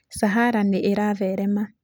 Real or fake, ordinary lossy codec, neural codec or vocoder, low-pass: real; none; none; none